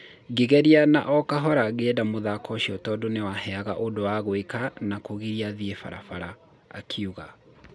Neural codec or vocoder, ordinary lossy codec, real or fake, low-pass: none; none; real; none